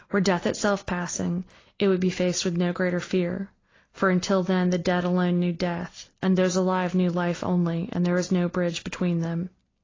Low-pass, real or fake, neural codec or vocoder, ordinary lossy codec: 7.2 kHz; real; none; AAC, 32 kbps